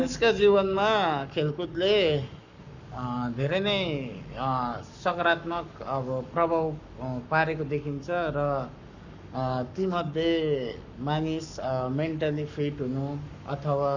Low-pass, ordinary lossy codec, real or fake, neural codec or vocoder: 7.2 kHz; none; fake; codec, 44.1 kHz, 7.8 kbps, Pupu-Codec